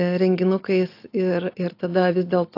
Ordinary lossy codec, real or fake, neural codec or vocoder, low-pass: AAC, 32 kbps; real; none; 5.4 kHz